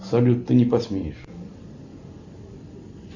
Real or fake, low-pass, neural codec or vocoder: real; 7.2 kHz; none